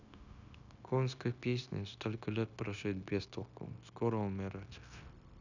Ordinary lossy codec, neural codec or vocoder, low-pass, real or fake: none; codec, 16 kHz, 0.9 kbps, LongCat-Audio-Codec; 7.2 kHz; fake